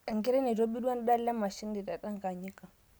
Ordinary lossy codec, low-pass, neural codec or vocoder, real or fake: none; none; none; real